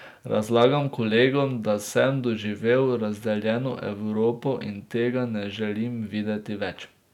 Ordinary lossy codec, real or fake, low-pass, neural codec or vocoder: none; real; 19.8 kHz; none